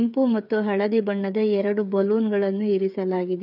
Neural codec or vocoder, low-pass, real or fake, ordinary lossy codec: codec, 16 kHz, 8 kbps, FreqCodec, smaller model; 5.4 kHz; fake; none